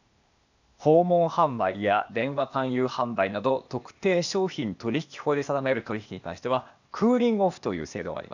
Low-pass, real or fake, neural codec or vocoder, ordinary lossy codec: 7.2 kHz; fake; codec, 16 kHz, 0.8 kbps, ZipCodec; none